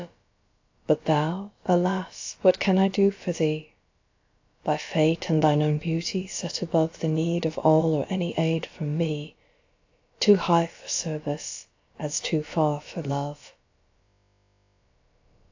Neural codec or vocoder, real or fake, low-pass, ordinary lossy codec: codec, 16 kHz, about 1 kbps, DyCAST, with the encoder's durations; fake; 7.2 kHz; AAC, 48 kbps